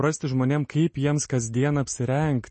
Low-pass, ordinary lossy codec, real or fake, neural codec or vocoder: 10.8 kHz; MP3, 32 kbps; real; none